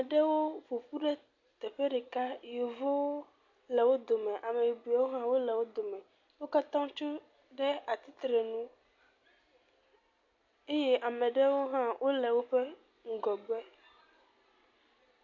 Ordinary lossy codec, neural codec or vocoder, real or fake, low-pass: MP3, 48 kbps; none; real; 7.2 kHz